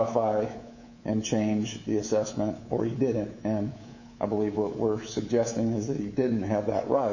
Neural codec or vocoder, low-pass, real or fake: codec, 24 kHz, 3.1 kbps, DualCodec; 7.2 kHz; fake